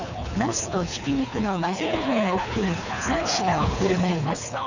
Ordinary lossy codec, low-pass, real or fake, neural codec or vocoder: none; 7.2 kHz; fake; codec, 24 kHz, 3 kbps, HILCodec